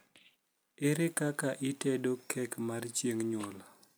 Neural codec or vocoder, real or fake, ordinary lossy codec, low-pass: none; real; none; none